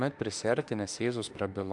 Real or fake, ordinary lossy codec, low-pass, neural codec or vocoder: fake; AAC, 64 kbps; 10.8 kHz; autoencoder, 48 kHz, 32 numbers a frame, DAC-VAE, trained on Japanese speech